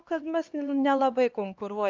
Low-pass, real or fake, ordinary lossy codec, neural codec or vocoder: 7.2 kHz; fake; Opus, 24 kbps; codec, 16 kHz, 2 kbps, X-Codec, HuBERT features, trained on LibriSpeech